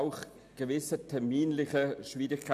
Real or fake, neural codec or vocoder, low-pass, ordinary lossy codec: real; none; 14.4 kHz; none